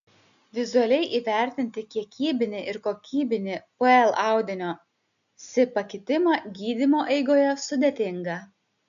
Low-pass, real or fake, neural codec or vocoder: 7.2 kHz; real; none